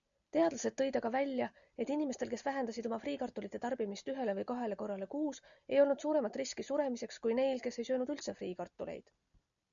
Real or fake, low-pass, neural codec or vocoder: real; 7.2 kHz; none